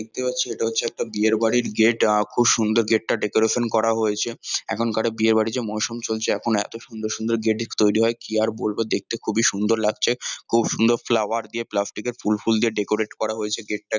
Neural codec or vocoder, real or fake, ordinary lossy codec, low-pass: none; real; none; 7.2 kHz